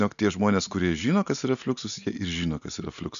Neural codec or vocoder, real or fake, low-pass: none; real; 7.2 kHz